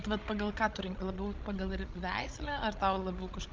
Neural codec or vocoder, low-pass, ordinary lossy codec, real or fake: codec, 16 kHz, 16 kbps, FreqCodec, larger model; 7.2 kHz; Opus, 32 kbps; fake